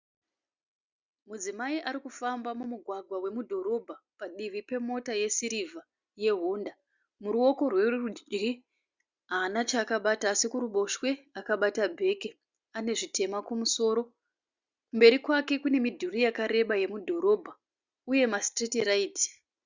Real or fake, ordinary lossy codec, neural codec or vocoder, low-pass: real; Opus, 64 kbps; none; 7.2 kHz